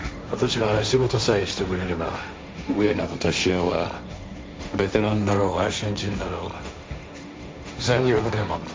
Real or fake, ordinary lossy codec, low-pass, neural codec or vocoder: fake; none; none; codec, 16 kHz, 1.1 kbps, Voila-Tokenizer